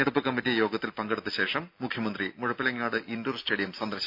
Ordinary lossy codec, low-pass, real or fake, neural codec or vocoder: AAC, 48 kbps; 5.4 kHz; real; none